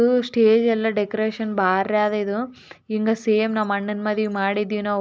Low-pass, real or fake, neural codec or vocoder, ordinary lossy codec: none; real; none; none